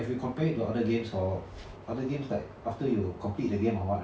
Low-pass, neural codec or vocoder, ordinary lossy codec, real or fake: none; none; none; real